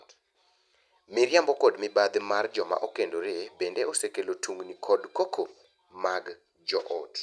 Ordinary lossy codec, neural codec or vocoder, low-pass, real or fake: none; none; none; real